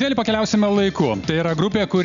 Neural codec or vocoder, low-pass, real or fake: none; 7.2 kHz; real